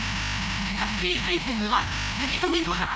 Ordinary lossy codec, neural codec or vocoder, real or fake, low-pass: none; codec, 16 kHz, 0.5 kbps, FreqCodec, larger model; fake; none